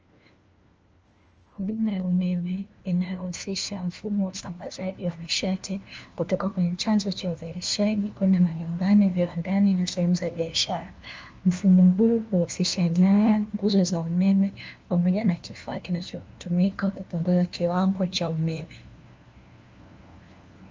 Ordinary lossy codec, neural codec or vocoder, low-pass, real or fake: Opus, 24 kbps; codec, 16 kHz, 1 kbps, FunCodec, trained on LibriTTS, 50 frames a second; 7.2 kHz; fake